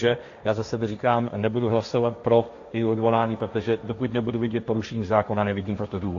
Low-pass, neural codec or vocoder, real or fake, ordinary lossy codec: 7.2 kHz; codec, 16 kHz, 1.1 kbps, Voila-Tokenizer; fake; AAC, 48 kbps